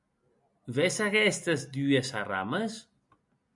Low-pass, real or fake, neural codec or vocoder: 10.8 kHz; real; none